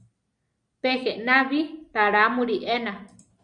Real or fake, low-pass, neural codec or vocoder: real; 9.9 kHz; none